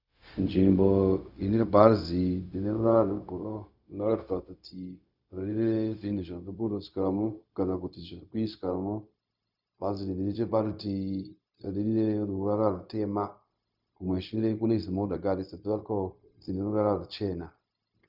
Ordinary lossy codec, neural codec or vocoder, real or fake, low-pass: Opus, 64 kbps; codec, 16 kHz, 0.4 kbps, LongCat-Audio-Codec; fake; 5.4 kHz